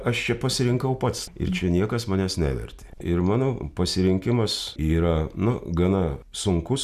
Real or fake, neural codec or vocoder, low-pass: real; none; 14.4 kHz